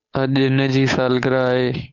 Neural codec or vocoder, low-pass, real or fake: codec, 16 kHz, 8 kbps, FunCodec, trained on Chinese and English, 25 frames a second; 7.2 kHz; fake